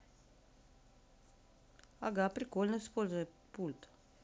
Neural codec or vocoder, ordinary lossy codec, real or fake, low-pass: none; none; real; none